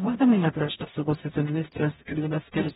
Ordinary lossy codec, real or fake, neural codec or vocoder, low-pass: AAC, 16 kbps; fake; codec, 44.1 kHz, 0.9 kbps, DAC; 19.8 kHz